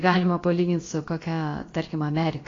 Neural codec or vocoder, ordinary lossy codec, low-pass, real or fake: codec, 16 kHz, about 1 kbps, DyCAST, with the encoder's durations; AAC, 32 kbps; 7.2 kHz; fake